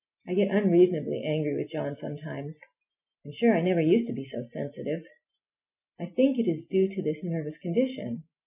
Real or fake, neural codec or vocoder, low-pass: real; none; 3.6 kHz